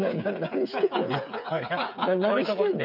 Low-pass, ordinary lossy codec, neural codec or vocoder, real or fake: 5.4 kHz; none; codec, 16 kHz, 8 kbps, FreqCodec, smaller model; fake